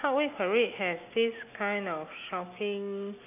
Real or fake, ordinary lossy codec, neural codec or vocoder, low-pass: real; none; none; 3.6 kHz